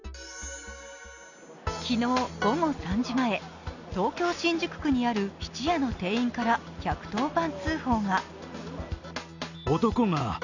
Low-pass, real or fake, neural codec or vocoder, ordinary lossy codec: 7.2 kHz; real; none; none